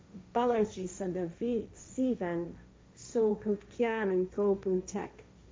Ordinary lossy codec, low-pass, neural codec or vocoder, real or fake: none; none; codec, 16 kHz, 1.1 kbps, Voila-Tokenizer; fake